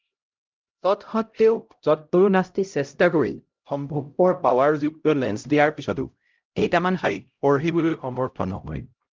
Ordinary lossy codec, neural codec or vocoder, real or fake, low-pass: Opus, 32 kbps; codec, 16 kHz, 0.5 kbps, X-Codec, HuBERT features, trained on LibriSpeech; fake; 7.2 kHz